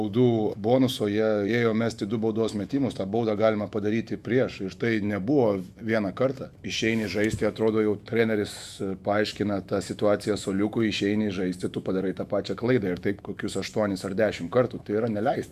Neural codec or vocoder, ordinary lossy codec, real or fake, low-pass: autoencoder, 48 kHz, 128 numbers a frame, DAC-VAE, trained on Japanese speech; Opus, 64 kbps; fake; 14.4 kHz